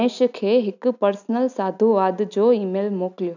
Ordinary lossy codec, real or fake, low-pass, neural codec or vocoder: none; real; 7.2 kHz; none